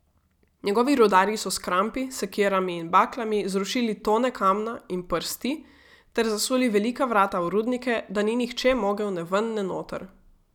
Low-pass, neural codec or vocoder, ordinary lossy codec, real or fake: 19.8 kHz; none; none; real